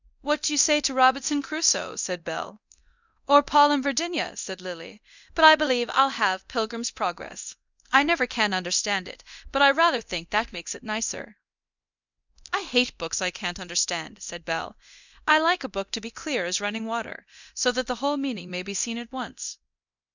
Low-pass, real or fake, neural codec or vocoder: 7.2 kHz; fake; codec, 24 kHz, 0.9 kbps, DualCodec